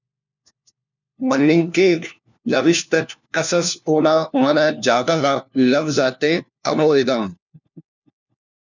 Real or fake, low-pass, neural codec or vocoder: fake; 7.2 kHz; codec, 16 kHz, 1 kbps, FunCodec, trained on LibriTTS, 50 frames a second